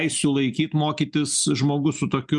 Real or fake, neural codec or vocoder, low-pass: real; none; 10.8 kHz